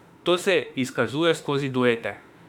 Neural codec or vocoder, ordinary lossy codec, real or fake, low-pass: autoencoder, 48 kHz, 32 numbers a frame, DAC-VAE, trained on Japanese speech; none; fake; 19.8 kHz